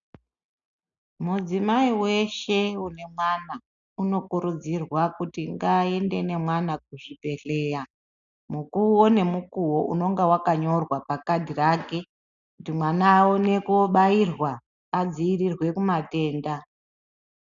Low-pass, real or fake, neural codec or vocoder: 7.2 kHz; real; none